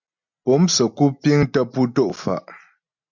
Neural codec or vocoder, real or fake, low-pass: none; real; 7.2 kHz